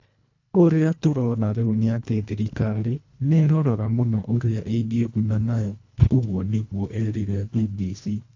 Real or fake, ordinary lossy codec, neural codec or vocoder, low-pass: fake; AAC, 48 kbps; codec, 24 kHz, 1.5 kbps, HILCodec; 7.2 kHz